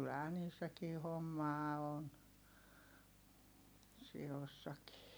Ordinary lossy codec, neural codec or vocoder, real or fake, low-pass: none; none; real; none